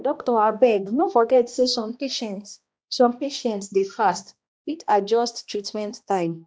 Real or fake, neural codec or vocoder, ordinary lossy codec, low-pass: fake; codec, 16 kHz, 1 kbps, X-Codec, HuBERT features, trained on balanced general audio; none; none